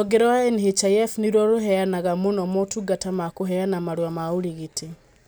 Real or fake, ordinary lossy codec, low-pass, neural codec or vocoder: real; none; none; none